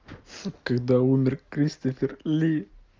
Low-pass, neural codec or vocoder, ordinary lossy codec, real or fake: 7.2 kHz; none; Opus, 32 kbps; real